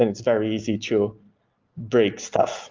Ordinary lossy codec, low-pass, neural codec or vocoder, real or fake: Opus, 32 kbps; 7.2 kHz; none; real